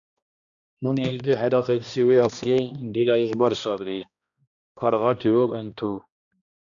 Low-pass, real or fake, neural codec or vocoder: 7.2 kHz; fake; codec, 16 kHz, 1 kbps, X-Codec, HuBERT features, trained on balanced general audio